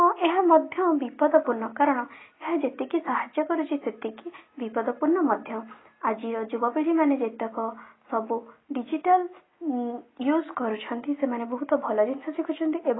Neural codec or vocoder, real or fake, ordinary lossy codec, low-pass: none; real; AAC, 16 kbps; 7.2 kHz